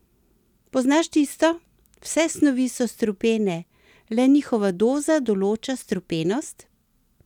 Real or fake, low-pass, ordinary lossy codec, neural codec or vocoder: real; 19.8 kHz; none; none